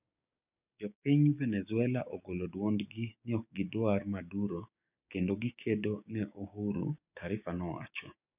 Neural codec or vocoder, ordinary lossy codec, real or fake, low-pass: codec, 16 kHz, 6 kbps, DAC; none; fake; 3.6 kHz